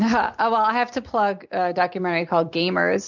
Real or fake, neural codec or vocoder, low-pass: real; none; 7.2 kHz